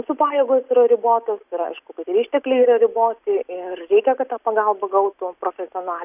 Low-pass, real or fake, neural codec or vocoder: 3.6 kHz; real; none